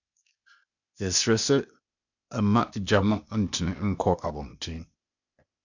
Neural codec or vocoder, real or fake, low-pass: codec, 16 kHz, 0.8 kbps, ZipCodec; fake; 7.2 kHz